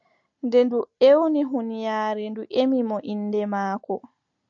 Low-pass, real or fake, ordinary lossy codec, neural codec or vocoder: 7.2 kHz; real; AAC, 64 kbps; none